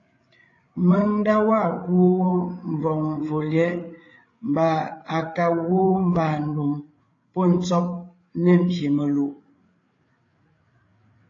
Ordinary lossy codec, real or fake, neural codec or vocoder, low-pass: AAC, 32 kbps; fake; codec, 16 kHz, 8 kbps, FreqCodec, larger model; 7.2 kHz